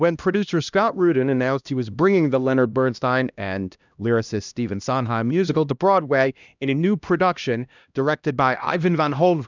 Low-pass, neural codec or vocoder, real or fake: 7.2 kHz; codec, 16 kHz, 1 kbps, X-Codec, HuBERT features, trained on LibriSpeech; fake